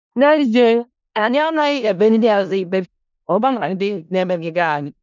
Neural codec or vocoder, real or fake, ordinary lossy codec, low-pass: codec, 16 kHz in and 24 kHz out, 0.4 kbps, LongCat-Audio-Codec, four codebook decoder; fake; none; 7.2 kHz